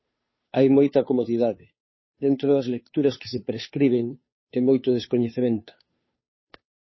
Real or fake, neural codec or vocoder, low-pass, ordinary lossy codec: fake; codec, 16 kHz, 2 kbps, FunCodec, trained on Chinese and English, 25 frames a second; 7.2 kHz; MP3, 24 kbps